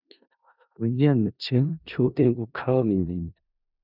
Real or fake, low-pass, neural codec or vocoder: fake; 5.4 kHz; codec, 16 kHz in and 24 kHz out, 0.4 kbps, LongCat-Audio-Codec, four codebook decoder